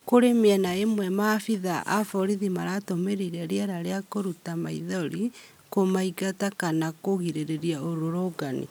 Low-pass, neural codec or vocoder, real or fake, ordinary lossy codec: none; none; real; none